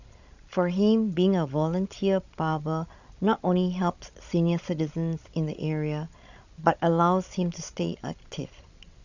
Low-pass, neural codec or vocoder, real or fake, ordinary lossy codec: 7.2 kHz; codec, 16 kHz, 16 kbps, FreqCodec, larger model; fake; none